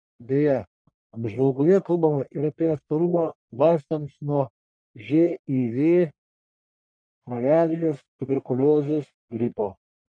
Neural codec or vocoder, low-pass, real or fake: codec, 44.1 kHz, 1.7 kbps, Pupu-Codec; 9.9 kHz; fake